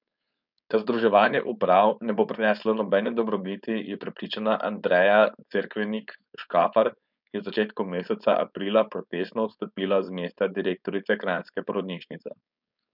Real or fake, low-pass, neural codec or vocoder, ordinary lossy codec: fake; 5.4 kHz; codec, 16 kHz, 4.8 kbps, FACodec; none